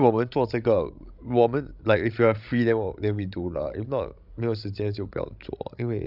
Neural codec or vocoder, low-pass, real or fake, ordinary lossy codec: codec, 16 kHz, 16 kbps, FreqCodec, larger model; 5.4 kHz; fake; none